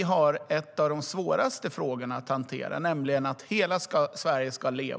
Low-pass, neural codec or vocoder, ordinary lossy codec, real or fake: none; none; none; real